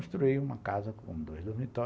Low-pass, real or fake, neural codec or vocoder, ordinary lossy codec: none; real; none; none